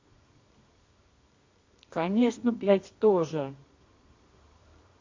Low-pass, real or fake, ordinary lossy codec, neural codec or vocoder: 7.2 kHz; fake; MP3, 48 kbps; codec, 24 kHz, 0.9 kbps, WavTokenizer, medium music audio release